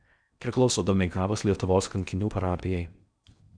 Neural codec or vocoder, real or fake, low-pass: codec, 16 kHz in and 24 kHz out, 0.8 kbps, FocalCodec, streaming, 65536 codes; fake; 9.9 kHz